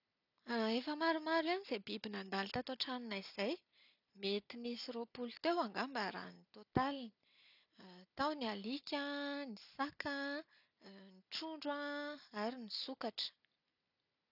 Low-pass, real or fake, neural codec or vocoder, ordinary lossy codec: 5.4 kHz; real; none; none